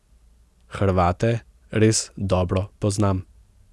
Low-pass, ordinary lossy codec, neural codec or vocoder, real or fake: none; none; none; real